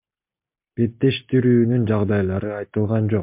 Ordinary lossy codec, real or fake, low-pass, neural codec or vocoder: MP3, 32 kbps; real; 3.6 kHz; none